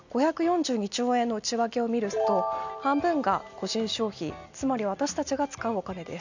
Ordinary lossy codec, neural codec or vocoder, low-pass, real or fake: none; none; 7.2 kHz; real